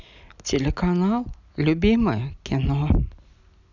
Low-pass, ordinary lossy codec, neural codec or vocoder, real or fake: 7.2 kHz; none; none; real